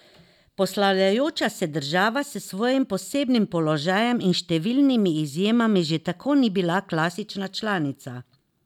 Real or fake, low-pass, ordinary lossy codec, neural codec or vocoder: real; 19.8 kHz; none; none